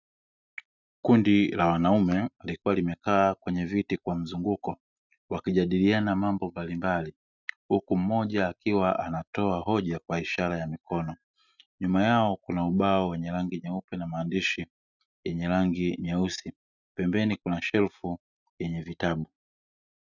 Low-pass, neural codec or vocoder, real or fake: 7.2 kHz; none; real